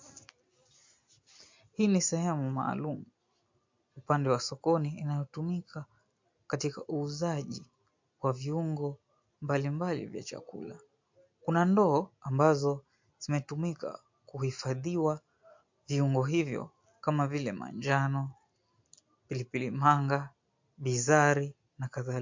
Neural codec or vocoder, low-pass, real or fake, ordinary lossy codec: none; 7.2 kHz; real; MP3, 48 kbps